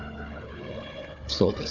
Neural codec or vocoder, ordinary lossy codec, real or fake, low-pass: codec, 16 kHz, 4 kbps, FunCodec, trained on Chinese and English, 50 frames a second; none; fake; 7.2 kHz